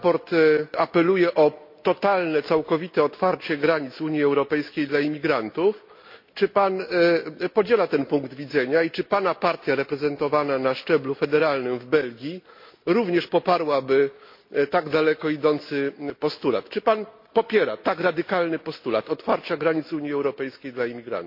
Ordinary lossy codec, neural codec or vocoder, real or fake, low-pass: MP3, 32 kbps; none; real; 5.4 kHz